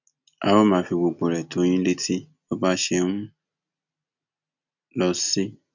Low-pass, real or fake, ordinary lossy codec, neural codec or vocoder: 7.2 kHz; real; none; none